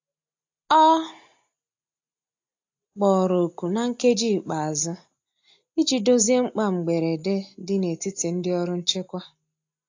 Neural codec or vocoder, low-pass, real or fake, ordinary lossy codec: none; 7.2 kHz; real; AAC, 48 kbps